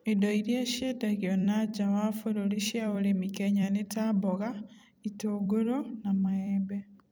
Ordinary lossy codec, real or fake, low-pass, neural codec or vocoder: none; fake; none; vocoder, 44.1 kHz, 128 mel bands every 256 samples, BigVGAN v2